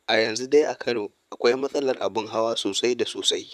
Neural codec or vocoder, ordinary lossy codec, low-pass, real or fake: vocoder, 44.1 kHz, 128 mel bands, Pupu-Vocoder; none; 14.4 kHz; fake